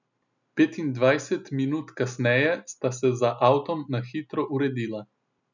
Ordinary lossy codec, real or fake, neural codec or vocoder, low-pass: none; real; none; 7.2 kHz